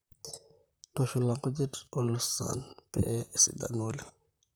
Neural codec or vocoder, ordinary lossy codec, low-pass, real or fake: vocoder, 44.1 kHz, 128 mel bands, Pupu-Vocoder; none; none; fake